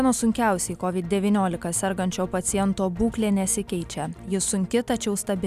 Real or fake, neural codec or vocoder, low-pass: real; none; 14.4 kHz